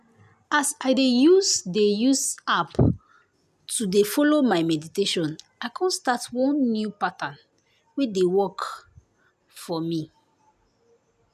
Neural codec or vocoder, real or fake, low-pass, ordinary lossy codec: none; real; 14.4 kHz; none